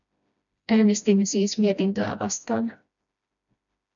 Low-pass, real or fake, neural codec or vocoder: 7.2 kHz; fake; codec, 16 kHz, 1 kbps, FreqCodec, smaller model